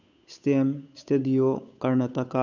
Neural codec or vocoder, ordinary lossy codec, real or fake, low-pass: codec, 16 kHz, 8 kbps, FunCodec, trained on Chinese and English, 25 frames a second; none; fake; 7.2 kHz